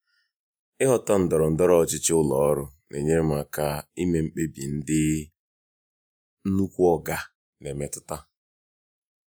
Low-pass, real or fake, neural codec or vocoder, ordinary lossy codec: none; fake; vocoder, 48 kHz, 128 mel bands, Vocos; none